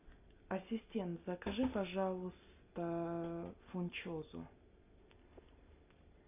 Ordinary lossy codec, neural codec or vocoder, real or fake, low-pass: AAC, 24 kbps; none; real; 3.6 kHz